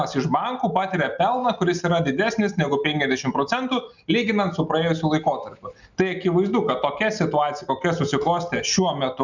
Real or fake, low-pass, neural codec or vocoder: real; 7.2 kHz; none